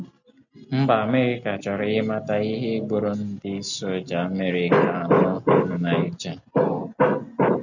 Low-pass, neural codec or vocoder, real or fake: 7.2 kHz; none; real